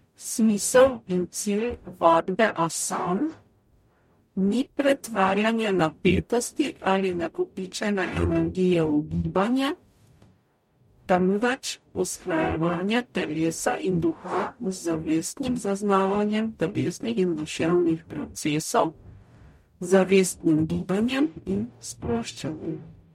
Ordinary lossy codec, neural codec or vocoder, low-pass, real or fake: MP3, 64 kbps; codec, 44.1 kHz, 0.9 kbps, DAC; 19.8 kHz; fake